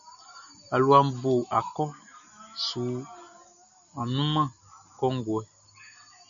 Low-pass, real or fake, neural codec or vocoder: 7.2 kHz; real; none